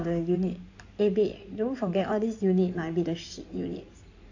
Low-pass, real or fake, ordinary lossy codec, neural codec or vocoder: 7.2 kHz; fake; AAC, 48 kbps; codec, 16 kHz in and 24 kHz out, 2.2 kbps, FireRedTTS-2 codec